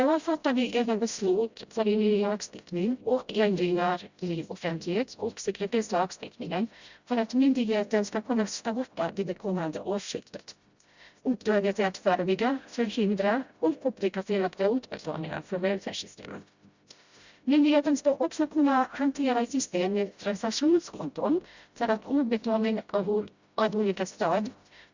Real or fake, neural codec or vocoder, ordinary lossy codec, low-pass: fake; codec, 16 kHz, 0.5 kbps, FreqCodec, smaller model; Opus, 64 kbps; 7.2 kHz